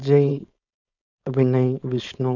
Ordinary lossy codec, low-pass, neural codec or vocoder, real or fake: none; 7.2 kHz; codec, 16 kHz, 4.8 kbps, FACodec; fake